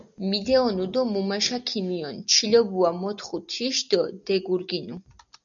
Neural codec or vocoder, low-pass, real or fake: none; 7.2 kHz; real